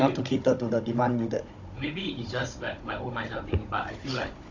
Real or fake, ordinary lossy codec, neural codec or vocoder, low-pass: fake; AAC, 48 kbps; codec, 16 kHz, 16 kbps, FunCodec, trained on Chinese and English, 50 frames a second; 7.2 kHz